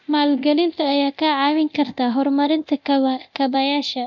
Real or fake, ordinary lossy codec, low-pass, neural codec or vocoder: fake; none; 7.2 kHz; codec, 24 kHz, 0.9 kbps, DualCodec